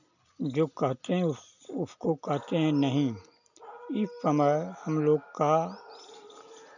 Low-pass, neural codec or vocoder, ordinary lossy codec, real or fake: 7.2 kHz; none; none; real